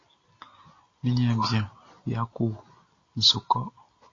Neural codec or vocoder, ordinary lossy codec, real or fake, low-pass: none; AAC, 32 kbps; real; 7.2 kHz